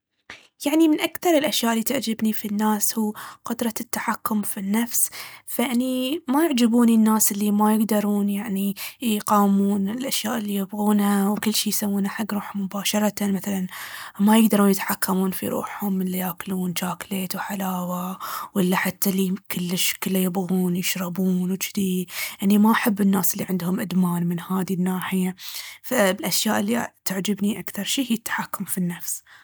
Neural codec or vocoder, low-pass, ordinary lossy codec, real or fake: none; none; none; real